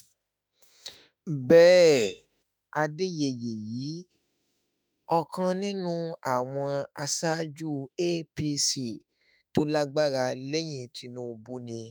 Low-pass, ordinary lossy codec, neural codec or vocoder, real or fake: none; none; autoencoder, 48 kHz, 32 numbers a frame, DAC-VAE, trained on Japanese speech; fake